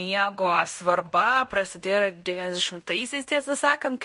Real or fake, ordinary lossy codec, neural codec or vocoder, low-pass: fake; MP3, 48 kbps; codec, 16 kHz in and 24 kHz out, 0.9 kbps, LongCat-Audio-Codec, fine tuned four codebook decoder; 10.8 kHz